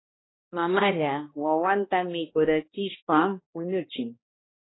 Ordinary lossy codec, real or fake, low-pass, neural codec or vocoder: AAC, 16 kbps; fake; 7.2 kHz; codec, 16 kHz, 1 kbps, X-Codec, HuBERT features, trained on balanced general audio